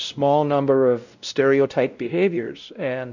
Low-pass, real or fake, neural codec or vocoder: 7.2 kHz; fake; codec, 16 kHz, 0.5 kbps, X-Codec, WavLM features, trained on Multilingual LibriSpeech